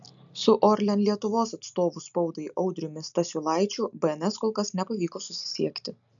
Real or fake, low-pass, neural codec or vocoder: real; 7.2 kHz; none